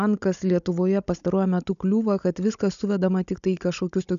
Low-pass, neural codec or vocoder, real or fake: 7.2 kHz; codec, 16 kHz, 16 kbps, FunCodec, trained on Chinese and English, 50 frames a second; fake